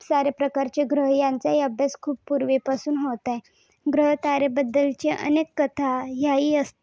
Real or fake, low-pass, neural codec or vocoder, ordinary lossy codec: real; none; none; none